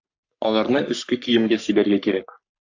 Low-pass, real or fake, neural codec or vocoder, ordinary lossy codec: 7.2 kHz; fake; codec, 44.1 kHz, 3.4 kbps, Pupu-Codec; AAC, 48 kbps